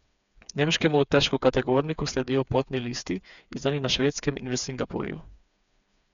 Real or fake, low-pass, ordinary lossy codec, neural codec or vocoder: fake; 7.2 kHz; none; codec, 16 kHz, 4 kbps, FreqCodec, smaller model